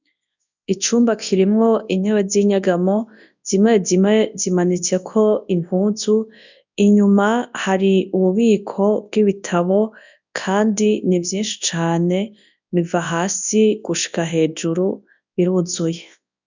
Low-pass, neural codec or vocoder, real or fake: 7.2 kHz; codec, 24 kHz, 0.9 kbps, WavTokenizer, large speech release; fake